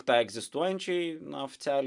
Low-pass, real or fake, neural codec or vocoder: 10.8 kHz; real; none